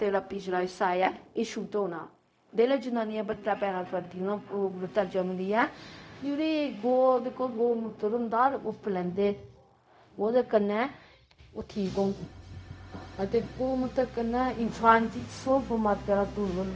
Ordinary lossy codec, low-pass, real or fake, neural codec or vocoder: none; none; fake; codec, 16 kHz, 0.4 kbps, LongCat-Audio-Codec